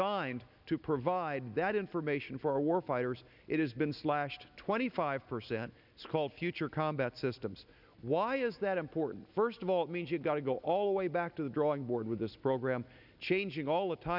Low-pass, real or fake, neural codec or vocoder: 5.4 kHz; real; none